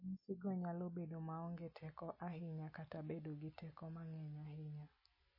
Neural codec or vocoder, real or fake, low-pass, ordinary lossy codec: none; real; 5.4 kHz; MP3, 24 kbps